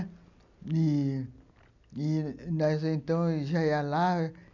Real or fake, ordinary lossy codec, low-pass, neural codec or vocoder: real; none; 7.2 kHz; none